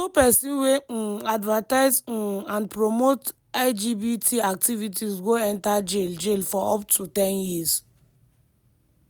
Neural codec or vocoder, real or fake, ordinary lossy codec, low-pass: none; real; none; none